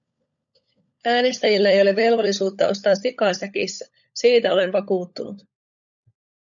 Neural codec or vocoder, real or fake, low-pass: codec, 16 kHz, 16 kbps, FunCodec, trained on LibriTTS, 50 frames a second; fake; 7.2 kHz